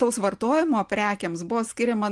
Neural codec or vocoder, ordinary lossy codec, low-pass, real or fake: none; Opus, 24 kbps; 10.8 kHz; real